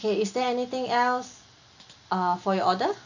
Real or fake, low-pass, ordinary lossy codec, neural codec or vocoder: real; 7.2 kHz; none; none